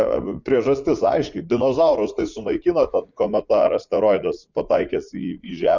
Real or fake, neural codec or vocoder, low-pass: fake; vocoder, 44.1 kHz, 80 mel bands, Vocos; 7.2 kHz